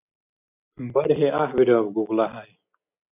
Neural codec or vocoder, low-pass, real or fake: none; 3.6 kHz; real